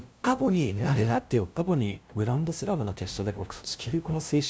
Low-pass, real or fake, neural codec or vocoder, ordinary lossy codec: none; fake; codec, 16 kHz, 0.5 kbps, FunCodec, trained on LibriTTS, 25 frames a second; none